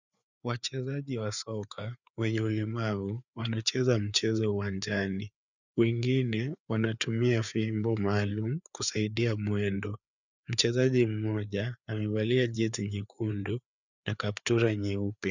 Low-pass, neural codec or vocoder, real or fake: 7.2 kHz; codec, 16 kHz, 4 kbps, FreqCodec, larger model; fake